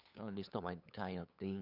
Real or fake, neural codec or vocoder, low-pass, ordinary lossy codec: fake; codec, 16 kHz, 8 kbps, FunCodec, trained on LibriTTS, 25 frames a second; 5.4 kHz; none